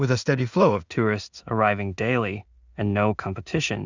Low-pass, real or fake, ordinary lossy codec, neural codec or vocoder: 7.2 kHz; fake; Opus, 64 kbps; codec, 16 kHz in and 24 kHz out, 0.4 kbps, LongCat-Audio-Codec, two codebook decoder